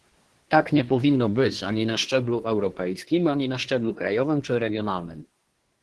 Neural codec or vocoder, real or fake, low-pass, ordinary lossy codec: codec, 24 kHz, 1 kbps, SNAC; fake; 10.8 kHz; Opus, 16 kbps